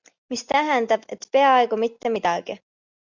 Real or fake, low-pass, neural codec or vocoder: real; 7.2 kHz; none